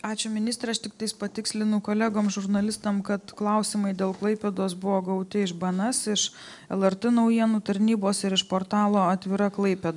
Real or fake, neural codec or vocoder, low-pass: real; none; 10.8 kHz